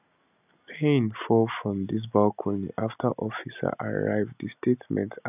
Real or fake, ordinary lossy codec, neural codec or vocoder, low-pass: real; none; none; 3.6 kHz